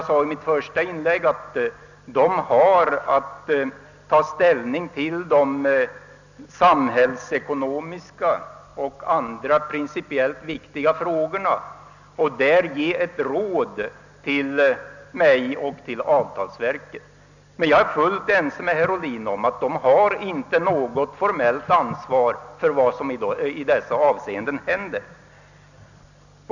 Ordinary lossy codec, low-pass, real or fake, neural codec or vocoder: none; 7.2 kHz; real; none